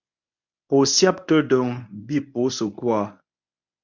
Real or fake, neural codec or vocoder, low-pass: fake; codec, 24 kHz, 0.9 kbps, WavTokenizer, medium speech release version 1; 7.2 kHz